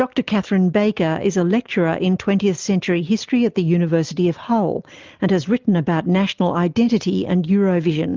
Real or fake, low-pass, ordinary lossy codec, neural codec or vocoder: real; 7.2 kHz; Opus, 24 kbps; none